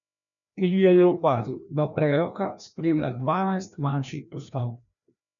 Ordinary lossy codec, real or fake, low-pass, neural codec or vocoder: none; fake; 7.2 kHz; codec, 16 kHz, 1 kbps, FreqCodec, larger model